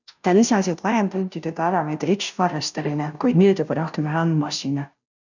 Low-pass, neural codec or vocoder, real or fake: 7.2 kHz; codec, 16 kHz, 0.5 kbps, FunCodec, trained on Chinese and English, 25 frames a second; fake